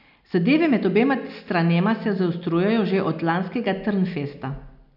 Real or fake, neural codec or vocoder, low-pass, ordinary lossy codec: real; none; 5.4 kHz; none